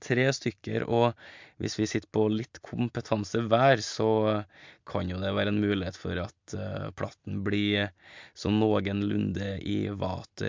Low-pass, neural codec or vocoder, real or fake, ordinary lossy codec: 7.2 kHz; none; real; MP3, 64 kbps